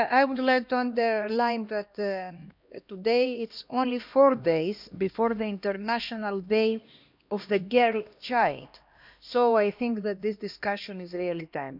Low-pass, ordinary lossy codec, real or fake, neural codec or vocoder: 5.4 kHz; none; fake; codec, 16 kHz, 1 kbps, X-Codec, HuBERT features, trained on LibriSpeech